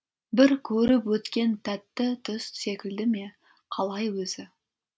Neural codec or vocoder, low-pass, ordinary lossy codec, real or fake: none; none; none; real